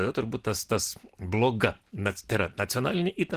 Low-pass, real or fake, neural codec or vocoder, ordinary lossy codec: 14.4 kHz; real; none; Opus, 16 kbps